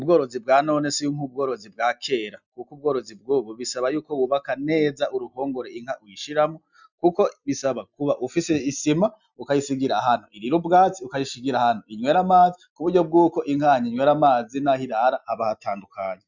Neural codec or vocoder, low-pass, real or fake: none; 7.2 kHz; real